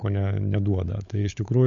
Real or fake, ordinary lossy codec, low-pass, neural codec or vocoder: fake; AAC, 64 kbps; 7.2 kHz; codec, 16 kHz, 16 kbps, FunCodec, trained on LibriTTS, 50 frames a second